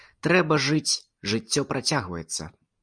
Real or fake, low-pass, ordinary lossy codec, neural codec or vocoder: real; 9.9 kHz; Opus, 64 kbps; none